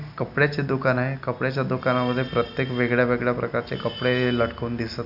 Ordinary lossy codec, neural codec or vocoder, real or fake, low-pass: none; none; real; 5.4 kHz